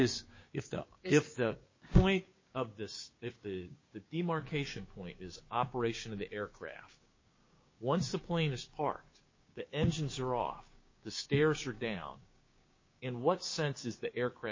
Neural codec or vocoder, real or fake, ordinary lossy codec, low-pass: codec, 16 kHz, 6 kbps, DAC; fake; MP3, 32 kbps; 7.2 kHz